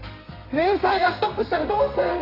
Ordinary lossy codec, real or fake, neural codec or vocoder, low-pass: AAC, 32 kbps; fake; codec, 32 kHz, 1.9 kbps, SNAC; 5.4 kHz